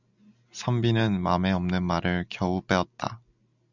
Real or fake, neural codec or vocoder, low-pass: real; none; 7.2 kHz